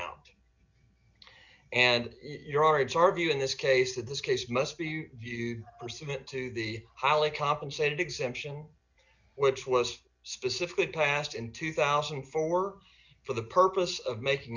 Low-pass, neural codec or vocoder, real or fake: 7.2 kHz; none; real